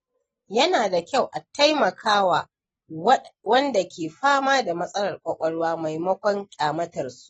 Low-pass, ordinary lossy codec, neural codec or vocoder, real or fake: 19.8 kHz; AAC, 24 kbps; none; real